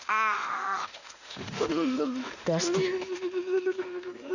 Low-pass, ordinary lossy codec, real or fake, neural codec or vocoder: 7.2 kHz; none; fake; autoencoder, 48 kHz, 32 numbers a frame, DAC-VAE, trained on Japanese speech